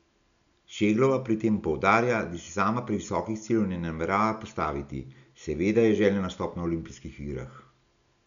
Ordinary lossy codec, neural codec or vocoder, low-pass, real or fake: MP3, 96 kbps; none; 7.2 kHz; real